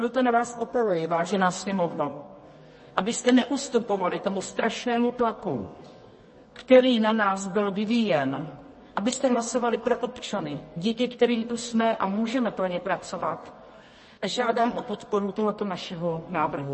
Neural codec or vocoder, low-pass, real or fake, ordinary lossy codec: codec, 24 kHz, 0.9 kbps, WavTokenizer, medium music audio release; 10.8 kHz; fake; MP3, 32 kbps